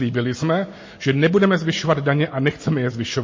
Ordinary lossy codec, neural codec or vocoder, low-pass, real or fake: MP3, 32 kbps; none; 7.2 kHz; real